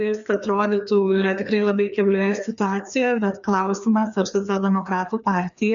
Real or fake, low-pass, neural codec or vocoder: fake; 7.2 kHz; codec, 16 kHz, 2 kbps, FreqCodec, larger model